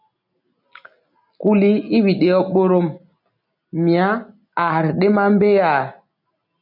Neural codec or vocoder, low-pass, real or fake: none; 5.4 kHz; real